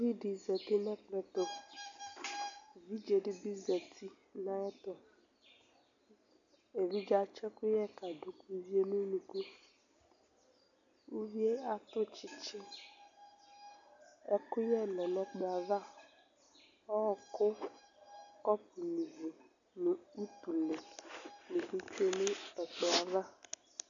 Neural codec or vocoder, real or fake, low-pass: none; real; 7.2 kHz